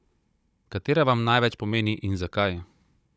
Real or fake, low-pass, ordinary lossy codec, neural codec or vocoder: fake; none; none; codec, 16 kHz, 16 kbps, FunCodec, trained on Chinese and English, 50 frames a second